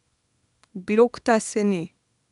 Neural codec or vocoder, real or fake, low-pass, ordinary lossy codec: codec, 24 kHz, 0.9 kbps, WavTokenizer, small release; fake; 10.8 kHz; none